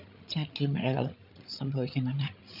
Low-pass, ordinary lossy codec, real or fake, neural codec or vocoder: 5.4 kHz; none; fake; codec, 16 kHz, 8 kbps, FreqCodec, larger model